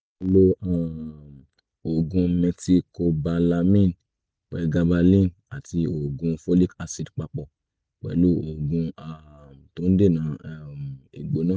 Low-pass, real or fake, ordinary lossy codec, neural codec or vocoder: none; real; none; none